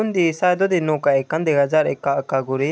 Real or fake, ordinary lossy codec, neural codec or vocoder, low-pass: real; none; none; none